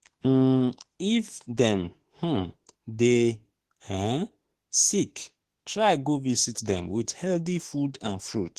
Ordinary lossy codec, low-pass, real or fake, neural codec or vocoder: Opus, 16 kbps; 14.4 kHz; fake; autoencoder, 48 kHz, 32 numbers a frame, DAC-VAE, trained on Japanese speech